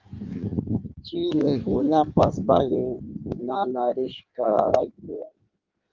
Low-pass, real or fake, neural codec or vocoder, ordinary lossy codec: 7.2 kHz; fake; codec, 16 kHz in and 24 kHz out, 1.1 kbps, FireRedTTS-2 codec; Opus, 24 kbps